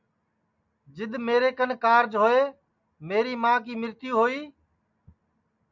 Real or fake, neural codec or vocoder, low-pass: real; none; 7.2 kHz